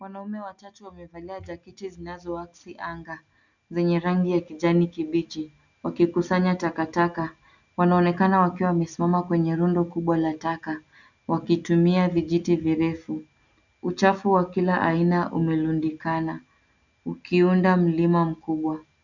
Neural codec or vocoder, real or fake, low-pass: none; real; 7.2 kHz